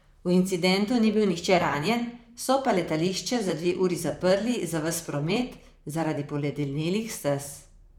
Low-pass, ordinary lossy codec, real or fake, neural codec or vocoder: 19.8 kHz; none; fake; vocoder, 44.1 kHz, 128 mel bands, Pupu-Vocoder